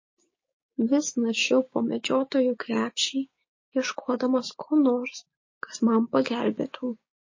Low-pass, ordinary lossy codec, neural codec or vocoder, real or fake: 7.2 kHz; MP3, 32 kbps; codec, 16 kHz, 6 kbps, DAC; fake